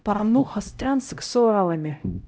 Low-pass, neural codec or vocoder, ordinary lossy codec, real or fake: none; codec, 16 kHz, 0.5 kbps, X-Codec, HuBERT features, trained on LibriSpeech; none; fake